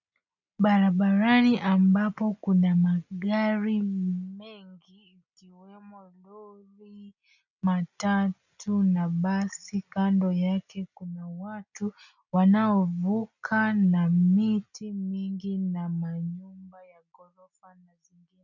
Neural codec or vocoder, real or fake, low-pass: none; real; 7.2 kHz